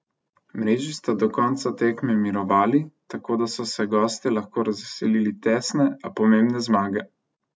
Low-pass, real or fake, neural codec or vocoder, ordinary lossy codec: none; real; none; none